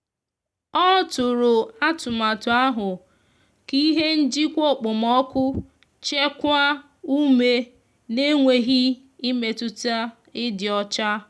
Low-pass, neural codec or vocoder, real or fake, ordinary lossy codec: none; none; real; none